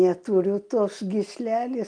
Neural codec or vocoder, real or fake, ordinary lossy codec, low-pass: none; real; Opus, 32 kbps; 9.9 kHz